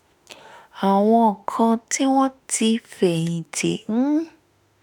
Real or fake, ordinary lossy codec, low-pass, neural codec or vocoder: fake; none; none; autoencoder, 48 kHz, 32 numbers a frame, DAC-VAE, trained on Japanese speech